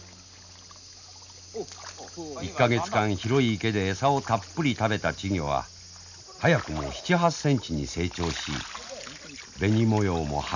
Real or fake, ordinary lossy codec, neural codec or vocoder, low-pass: real; none; none; 7.2 kHz